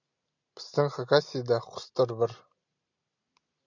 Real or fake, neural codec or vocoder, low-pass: real; none; 7.2 kHz